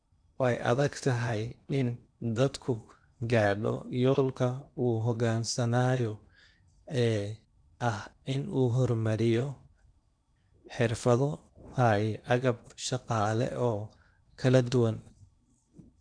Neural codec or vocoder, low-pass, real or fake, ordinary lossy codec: codec, 16 kHz in and 24 kHz out, 0.8 kbps, FocalCodec, streaming, 65536 codes; 9.9 kHz; fake; none